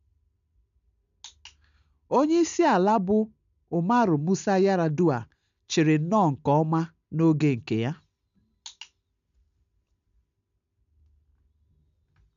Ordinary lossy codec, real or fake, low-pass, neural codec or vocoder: none; real; 7.2 kHz; none